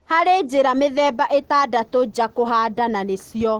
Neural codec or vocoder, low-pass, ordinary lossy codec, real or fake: none; 19.8 kHz; Opus, 16 kbps; real